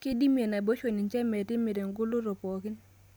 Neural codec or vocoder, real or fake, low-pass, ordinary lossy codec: none; real; none; none